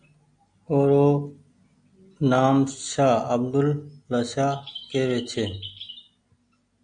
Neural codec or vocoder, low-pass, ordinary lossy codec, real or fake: none; 9.9 kHz; AAC, 64 kbps; real